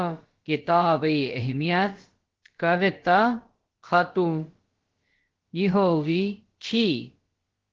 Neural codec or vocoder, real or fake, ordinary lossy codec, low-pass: codec, 16 kHz, about 1 kbps, DyCAST, with the encoder's durations; fake; Opus, 16 kbps; 7.2 kHz